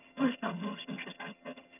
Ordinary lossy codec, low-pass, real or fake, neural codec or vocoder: none; 3.6 kHz; fake; vocoder, 22.05 kHz, 80 mel bands, HiFi-GAN